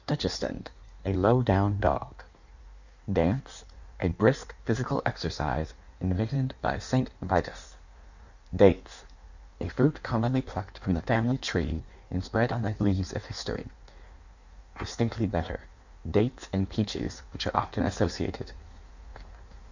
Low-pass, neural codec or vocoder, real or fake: 7.2 kHz; codec, 16 kHz in and 24 kHz out, 1.1 kbps, FireRedTTS-2 codec; fake